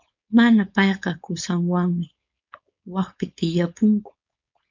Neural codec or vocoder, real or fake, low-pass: codec, 16 kHz, 4.8 kbps, FACodec; fake; 7.2 kHz